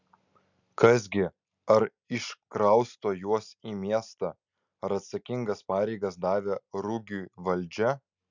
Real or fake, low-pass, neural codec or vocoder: real; 7.2 kHz; none